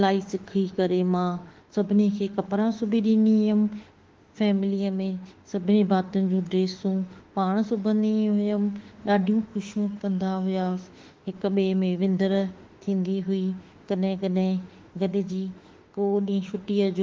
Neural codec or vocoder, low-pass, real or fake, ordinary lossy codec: autoencoder, 48 kHz, 32 numbers a frame, DAC-VAE, trained on Japanese speech; 7.2 kHz; fake; Opus, 16 kbps